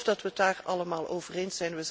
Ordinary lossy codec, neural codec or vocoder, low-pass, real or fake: none; none; none; real